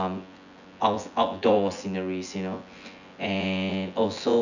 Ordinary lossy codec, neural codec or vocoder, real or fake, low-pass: none; vocoder, 24 kHz, 100 mel bands, Vocos; fake; 7.2 kHz